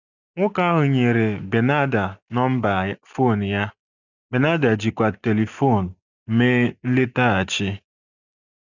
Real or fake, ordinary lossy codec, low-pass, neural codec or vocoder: real; none; 7.2 kHz; none